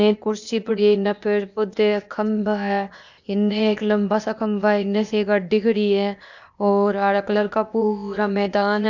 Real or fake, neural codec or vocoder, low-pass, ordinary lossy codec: fake; codec, 16 kHz, 0.8 kbps, ZipCodec; 7.2 kHz; none